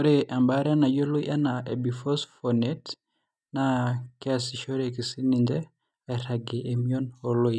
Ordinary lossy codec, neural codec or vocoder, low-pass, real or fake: none; none; none; real